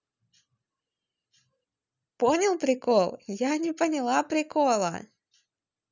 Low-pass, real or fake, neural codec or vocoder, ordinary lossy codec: 7.2 kHz; real; none; none